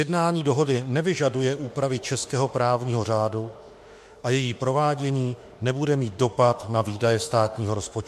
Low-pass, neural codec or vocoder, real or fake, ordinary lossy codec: 14.4 kHz; autoencoder, 48 kHz, 32 numbers a frame, DAC-VAE, trained on Japanese speech; fake; MP3, 64 kbps